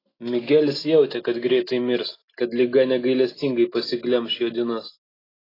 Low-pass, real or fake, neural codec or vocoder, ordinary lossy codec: 5.4 kHz; real; none; AAC, 24 kbps